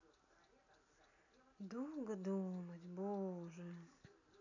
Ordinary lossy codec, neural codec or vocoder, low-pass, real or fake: none; none; 7.2 kHz; real